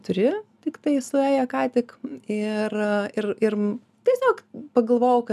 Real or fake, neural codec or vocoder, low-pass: real; none; 14.4 kHz